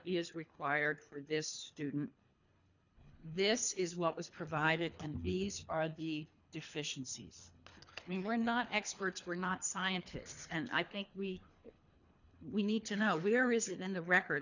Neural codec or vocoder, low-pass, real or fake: codec, 24 kHz, 3 kbps, HILCodec; 7.2 kHz; fake